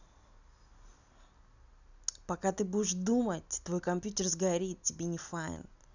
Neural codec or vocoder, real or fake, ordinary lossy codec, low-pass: none; real; none; 7.2 kHz